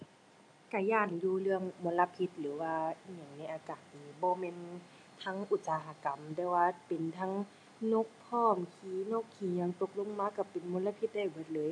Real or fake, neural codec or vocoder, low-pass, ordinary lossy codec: real; none; none; none